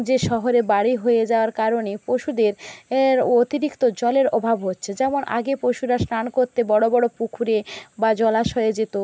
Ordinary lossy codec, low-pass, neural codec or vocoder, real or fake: none; none; none; real